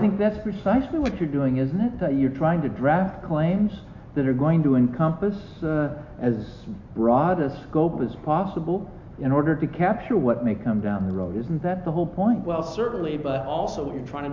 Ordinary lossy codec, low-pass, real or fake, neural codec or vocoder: MP3, 64 kbps; 7.2 kHz; real; none